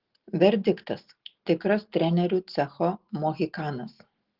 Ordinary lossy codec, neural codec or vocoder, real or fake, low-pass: Opus, 16 kbps; none; real; 5.4 kHz